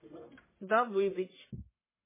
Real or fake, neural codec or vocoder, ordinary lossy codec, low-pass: fake; codec, 44.1 kHz, 1.7 kbps, Pupu-Codec; MP3, 16 kbps; 3.6 kHz